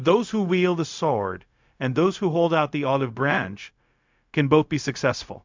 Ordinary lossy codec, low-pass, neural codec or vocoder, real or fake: MP3, 64 kbps; 7.2 kHz; codec, 16 kHz, 0.4 kbps, LongCat-Audio-Codec; fake